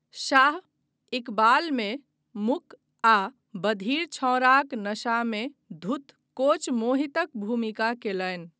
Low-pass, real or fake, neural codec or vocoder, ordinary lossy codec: none; real; none; none